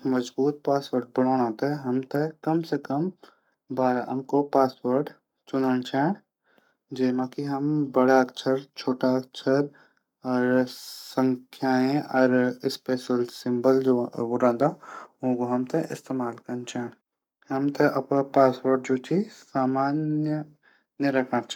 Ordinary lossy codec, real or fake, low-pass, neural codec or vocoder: none; fake; 19.8 kHz; codec, 44.1 kHz, 7.8 kbps, Pupu-Codec